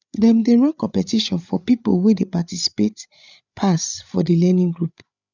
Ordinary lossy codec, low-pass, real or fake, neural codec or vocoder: none; 7.2 kHz; fake; codec, 16 kHz, 8 kbps, FreqCodec, larger model